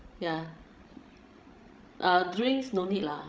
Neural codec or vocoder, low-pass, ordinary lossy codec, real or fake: codec, 16 kHz, 16 kbps, FreqCodec, larger model; none; none; fake